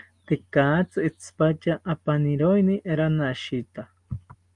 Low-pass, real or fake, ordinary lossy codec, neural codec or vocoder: 10.8 kHz; real; Opus, 32 kbps; none